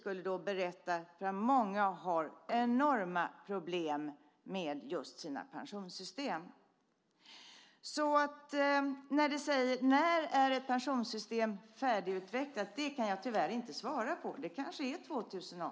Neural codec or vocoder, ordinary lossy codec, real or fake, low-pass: none; none; real; none